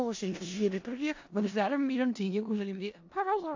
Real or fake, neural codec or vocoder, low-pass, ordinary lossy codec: fake; codec, 16 kHz in and 24 kHz out, 0.4 kbps, LongCat-Audio-Codec, four codebook decoder; 7.2 kHz; AAC, 48 kbps